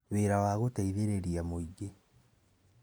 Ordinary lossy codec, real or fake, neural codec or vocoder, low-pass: none; real; none; none